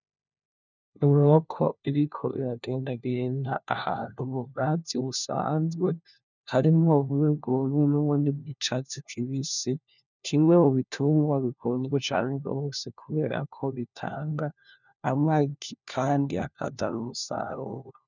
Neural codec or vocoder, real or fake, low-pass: codec, 16 kHz, 1 kbps, FunCodec, trained on LibriTTS, 50 frames a second; fake; 7.2 kHz